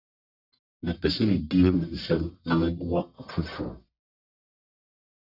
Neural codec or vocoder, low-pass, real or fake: codec, 44.1 kHz, 1.7 kbps, Pupu-Codec; 5.4 kHz; fake